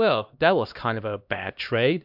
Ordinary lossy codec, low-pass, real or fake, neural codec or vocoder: AAC, 48 kbps; 5.4 kHz; fake; codec, 16 kHz, 1 kbps, X-Codec, HuBERT features, trained on LibriSpeech